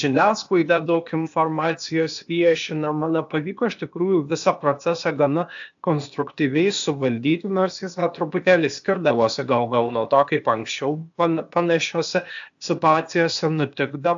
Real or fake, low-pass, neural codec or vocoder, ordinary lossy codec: fake; 7.2 kHz; codec, 16 kHz, 0.8 kbps, ZipCodec; AAC, 64 kbps